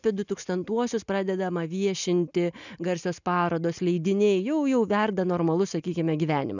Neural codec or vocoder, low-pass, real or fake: none; 7.2 kHz; real